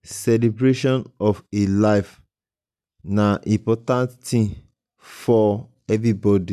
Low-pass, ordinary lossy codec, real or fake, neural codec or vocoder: 14.4 kHz; none; real; none